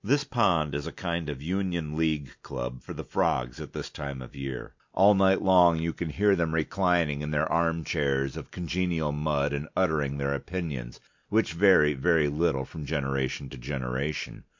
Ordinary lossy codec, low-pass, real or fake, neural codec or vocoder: MP3, 48 kbps; 7.2 kHz; real; none